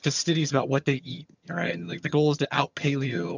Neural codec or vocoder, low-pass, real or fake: vocoder, 22.05 kHz, 80 mel bands, HiFi-GAN; 7.2 kHz; fake